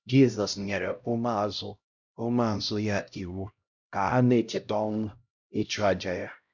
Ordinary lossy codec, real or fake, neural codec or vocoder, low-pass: none; fake; codec, 16 kHz, 0.5 kbps, X-Codec, HuBERT features, trained on LibriSpeech; 7.2 kHz